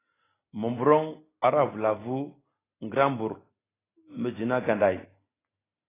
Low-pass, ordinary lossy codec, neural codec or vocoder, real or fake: 3.6 kHz; AAC, 16 kbps; none; real